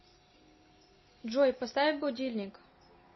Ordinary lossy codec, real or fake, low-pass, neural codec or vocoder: MP3, 24 kbps; real; 7.2 kHz; none